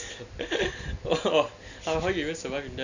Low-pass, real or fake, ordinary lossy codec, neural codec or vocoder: 7.2 kHz; real; none; none